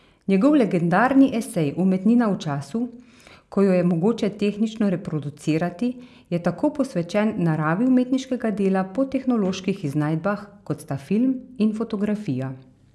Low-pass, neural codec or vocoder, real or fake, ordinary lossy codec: none; none; real; none